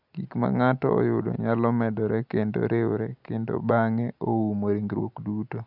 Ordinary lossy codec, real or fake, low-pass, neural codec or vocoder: none; real; 5.4 kHz; none